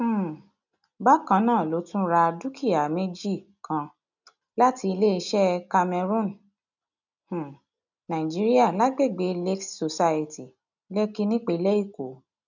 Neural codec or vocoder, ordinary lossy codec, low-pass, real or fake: none; none; 7.2 kHz; real